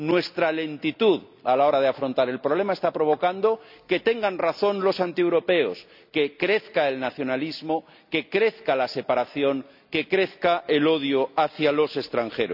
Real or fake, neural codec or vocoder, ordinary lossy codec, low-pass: real; none; none; 5.4 kHz